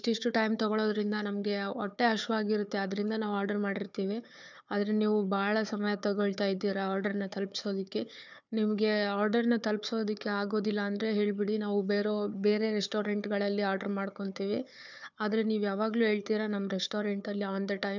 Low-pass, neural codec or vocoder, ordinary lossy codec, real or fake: 7.2 kHz; codec, 16 kHz, 4 kbps, FunCodec, trained on Chinese and English, 50 frames a second; none; fake